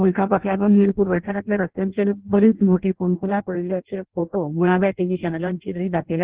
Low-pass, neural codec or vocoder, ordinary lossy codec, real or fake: 3.6 kHz; codec, 16 kHz in and 24 kHz out, 0.6 kbps, FireRedTTS-2 codec; Opus, 16 kbps; fake